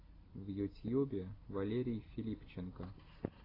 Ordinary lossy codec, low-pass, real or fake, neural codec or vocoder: AAC, 24 kbps; 5.4 kHz; real; none